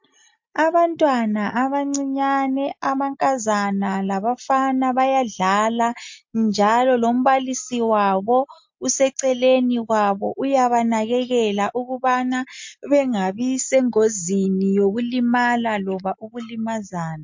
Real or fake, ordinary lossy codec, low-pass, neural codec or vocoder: real; MP3, 48 kbps; 7.2 kHz; none